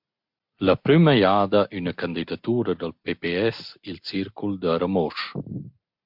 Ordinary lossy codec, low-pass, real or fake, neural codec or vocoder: MP3, 48 kbps; 5.4 kHz; real; none